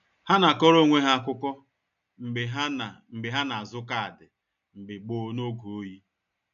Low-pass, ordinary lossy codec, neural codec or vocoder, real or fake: 7.2 kHz; none; none; real